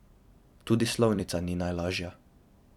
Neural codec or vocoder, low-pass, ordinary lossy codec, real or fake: vocoder, 44.1 kHz, 128 mel bands every 256 samples, BigVGAN v2; 19.8 kHz; none; fake